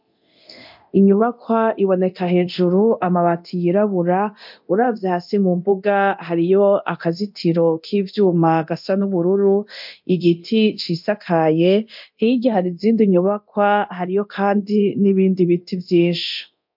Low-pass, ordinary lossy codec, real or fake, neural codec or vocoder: 5.4 kHz; MP3, 48 kbps; fake; codec, 24 kHz, 0.9 kbps, DualCodec